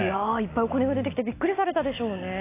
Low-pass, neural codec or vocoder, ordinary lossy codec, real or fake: 3.6 kHz; none; AAC, 24 kbps; real